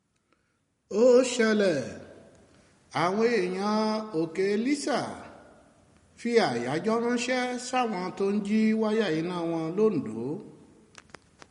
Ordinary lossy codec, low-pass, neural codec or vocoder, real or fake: MP3, 48 kbps; 10.8 kHz; none; real